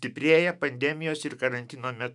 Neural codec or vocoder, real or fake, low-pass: autoencoder, 48 kHz, 128 numbers a frame, DAC-VAE, trained on Japanese speech; fake; 10.8 kHz